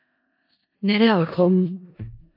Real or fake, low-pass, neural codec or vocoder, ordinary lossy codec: fake; 5.4 kHz; codec, 16 kHz in and 24 kHz out, 0.4 kbps, LongCat-Audio-Codec, four codebook decoder; AAC, 48 kbps